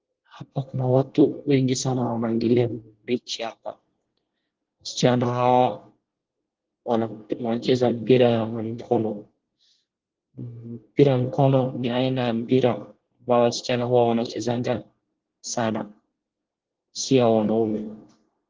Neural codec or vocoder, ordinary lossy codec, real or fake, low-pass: codec, 24 kHz, 1 kbps, SNAC; Opus, 16 kbps; fake; 7.2 kHz